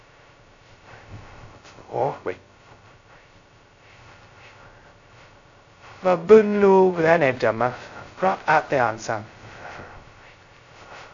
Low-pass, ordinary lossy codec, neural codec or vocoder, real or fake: 7.2 kHz; AAC, 64 kbps; codec, 16 kHz, 0.2 kbps, FocalCodec; fake